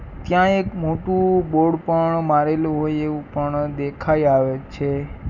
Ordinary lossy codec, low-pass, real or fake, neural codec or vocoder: none; 7.2 kHz; real; none